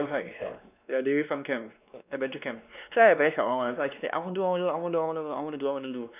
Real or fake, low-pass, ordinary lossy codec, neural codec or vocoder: fake; 3.6 kHz; none; codec, 16 kHz, 2 kbps, X-Codec, WavLM features, trained on Multilingual LibriSpeech